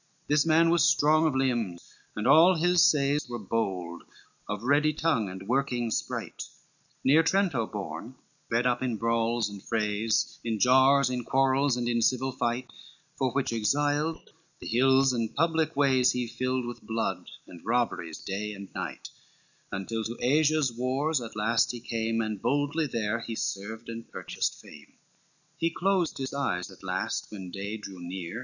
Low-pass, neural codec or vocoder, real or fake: 7.2 kHz; none; real